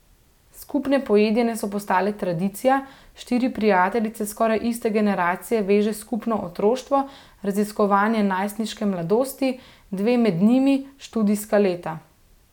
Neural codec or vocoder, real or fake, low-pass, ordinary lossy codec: none; real; 19.8 kHz; none